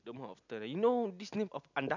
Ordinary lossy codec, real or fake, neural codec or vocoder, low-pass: none; real; none; 7.2 kHz